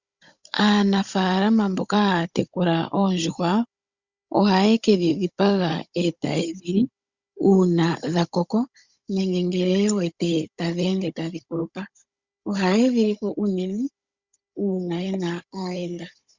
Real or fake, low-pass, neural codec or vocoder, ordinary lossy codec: fake; 7.2 kHz; codec, 16 kHz, 16 kbps, FunCodec, trained on Chinese and English, 50 frames a second; Opus, 64 kbps